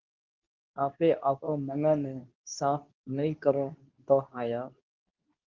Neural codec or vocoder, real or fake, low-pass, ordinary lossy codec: codec, 24 kHz, 0.9 kbps, WavTokenizer, medium speech release version 2; fake; 7.2 kHz; Opus, 32 kbps